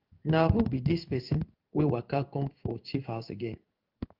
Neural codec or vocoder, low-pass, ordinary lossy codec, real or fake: codec, 16 kHz in and 24 kHz out, 1 kbps, XY-Tokenizer; 5.4 kHz; Opus, 24 kbps; fake